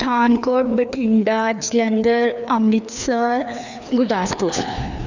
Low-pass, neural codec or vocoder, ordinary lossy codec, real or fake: 7.2 kHz; codec, 16 kHz, 2 kbps, FreqCodec, larger model; none; fake